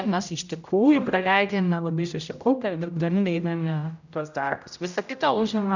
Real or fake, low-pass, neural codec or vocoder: fake; 7.2 kHz; codec, 16 kHz, 0.5 kbps, X-Codec, HuBERT features, trained on general audio